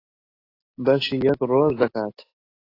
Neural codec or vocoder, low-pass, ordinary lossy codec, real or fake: none; 5.4 kHz; AAC, 24 kbps; real